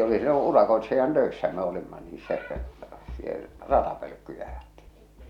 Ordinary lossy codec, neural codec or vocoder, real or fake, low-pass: none; none; real; 19.8 kHz